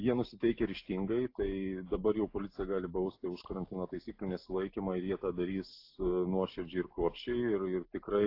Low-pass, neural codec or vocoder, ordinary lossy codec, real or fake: 5.4 kHz; none; AAC, 32 kbps; real